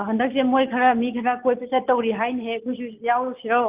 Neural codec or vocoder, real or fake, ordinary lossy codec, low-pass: none; real; Opus, 24 kbps; 3.6 kHz